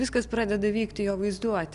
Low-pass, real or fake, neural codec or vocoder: 10.8 kHz; real; none